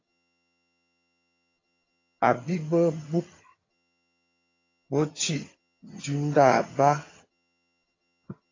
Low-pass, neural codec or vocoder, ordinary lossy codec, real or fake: 7.2 kHz; vocoder, 22.05 kHz, 80 mel bands, HiFi-GAN; AAC, 32 kbps; fake